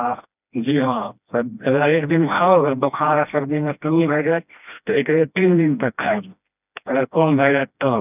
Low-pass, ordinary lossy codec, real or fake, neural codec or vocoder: 3.6 kHz; none; fake; codec, 16 kHz, 1 kbps, FreqCodec, smaller model